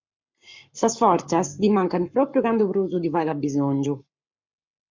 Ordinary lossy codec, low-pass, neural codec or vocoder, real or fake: MP3, 64 kbps; 7.2 kHz; codec, 44.1 kHz, 7.8 kbps, Pupu-Codec; fake